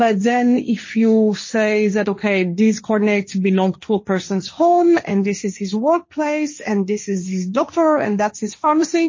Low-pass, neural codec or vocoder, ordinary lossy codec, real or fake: 7.2 kHz; codec, 16 kHz, 1.1 kbps, Voila-Tokenizer; MP3, 32 kbps; fake